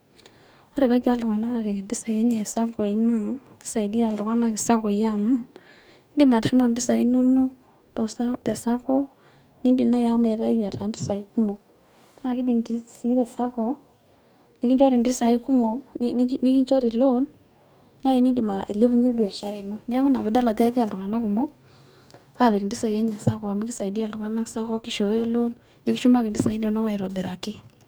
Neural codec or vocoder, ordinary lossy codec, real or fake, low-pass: codec, 44.1 kHz, 2.6 kbps, DAC; none; fake; none